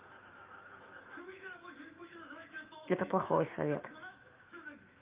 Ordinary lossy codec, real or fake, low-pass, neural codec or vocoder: Opus, 24 kbps; fake; 3.6 kHz; autoencoder, 48 kHz, 128 numbers a frame, DAC-VAE, trained on Japanese speech